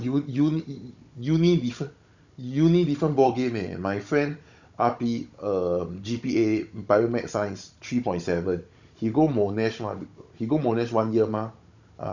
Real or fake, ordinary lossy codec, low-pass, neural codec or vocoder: fake; none; 7.2 kHz; codec, 16 kHz, 16 kbps, FunCodec, trained on Chinese and English, 50 frames a second